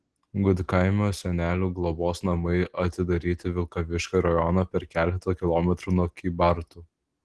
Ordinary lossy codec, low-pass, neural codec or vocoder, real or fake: Opus, 16 kbps; 10.8 kHz; none; real